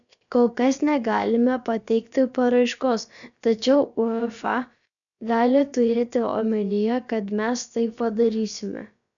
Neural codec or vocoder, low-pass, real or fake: codec, 16 kHz, about 1 kbps, DyCAST, with the encoder's durations; 7.2 kHz; fake